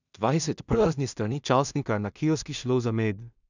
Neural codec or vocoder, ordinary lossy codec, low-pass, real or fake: codec, 16 kHz in and 24 kHz out, 0.4 kbps, LongCat-Audio-Codec, two codebook decoder; none; 7.2 kHz; fake